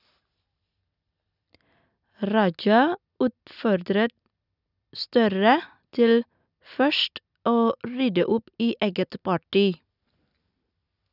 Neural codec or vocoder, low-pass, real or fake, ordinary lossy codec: none; 5.4 kHz; real; none